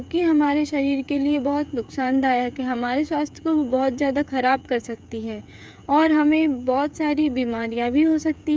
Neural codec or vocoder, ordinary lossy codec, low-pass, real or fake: codec, 16 kHz, 8 kbps, FreqCodec, smaller model; none; none; fake